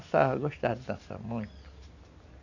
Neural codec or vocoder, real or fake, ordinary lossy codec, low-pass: none; real; none; 7.2 kHz